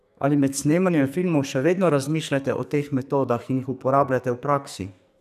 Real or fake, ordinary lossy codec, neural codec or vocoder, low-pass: fake; none; codec, 44.1 kHz, 2.6 kbps, SNAC; 14.4 kHz